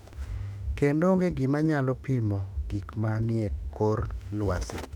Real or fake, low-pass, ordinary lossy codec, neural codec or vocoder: fake; 19.8 kHz; none; autoencoder, 48 kHz, 32 numbers a frame, DAC-VAE, trained on Japanese speech